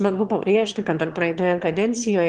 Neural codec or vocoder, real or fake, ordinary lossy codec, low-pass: autoencoder, 22.05 kHz, a latent of 192 numbers a frame, VITS, trained on one speaker; fake; Opus, 16 kbps; 9.9 kHz